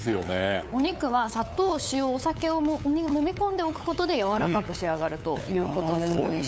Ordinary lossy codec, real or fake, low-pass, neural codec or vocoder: none; fake; none; codec, 16 kHz, 8 kbps, FunCodec, trained on LibriTTS, 25 frames a second